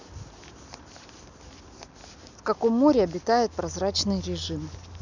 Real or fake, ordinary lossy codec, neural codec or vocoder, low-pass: real; none; none; 7.2 kHz